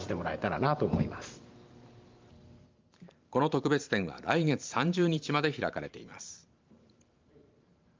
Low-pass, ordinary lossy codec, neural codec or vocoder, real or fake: 7.2 kHz; Opus, 32 kbps; vocoder, 44.1 kHz, 128 mel bands every 512 samples, BigVGAN v2; fake